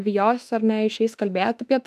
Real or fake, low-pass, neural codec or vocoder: fake; 14.4 kHz; autoencoder, 48 kHz, 32 numbers a frame, DAC-VAE, trained on Japanese speech